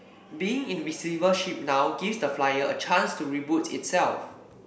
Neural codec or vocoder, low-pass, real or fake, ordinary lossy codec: none; none; real; none